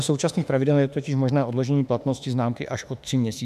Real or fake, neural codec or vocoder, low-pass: fake; autoencoder, 48 kHz, 32 numbers a frame, DAC-VAE, trained on Japanese speech; 14.4 kHz